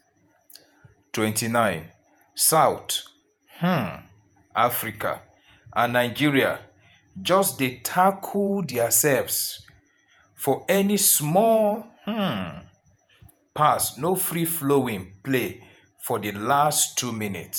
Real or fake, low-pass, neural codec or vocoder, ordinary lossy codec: fake; none; vocoder, 48 kHz, 128 mel bands, Vocos; none